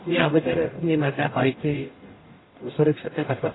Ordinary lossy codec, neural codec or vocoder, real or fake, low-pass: AAC, 16 kbps; codec, 44.1 kHz, 0.9 kbps, DAC; fake; 7.2 kHz